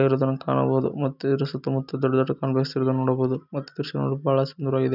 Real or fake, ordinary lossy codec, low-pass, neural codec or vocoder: real; none; 5.4 kHz; none